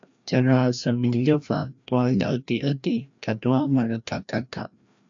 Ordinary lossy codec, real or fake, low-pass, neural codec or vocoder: AAC, 64 kbps; fake; 7.2 kHz; codec, 16 kHz, 1 kbps, FreqCodec, larger model